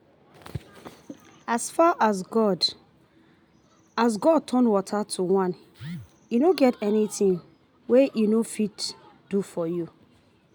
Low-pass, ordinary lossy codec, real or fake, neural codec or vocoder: none; none; real; none